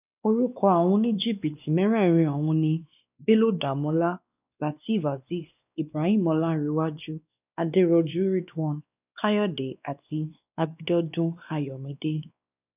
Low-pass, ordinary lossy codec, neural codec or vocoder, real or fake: 3.6 kHz; none; codec, 16 kHz, 2 kbps, X-Codec, WavLM features, trained on Multilingual LibriSpeech; fake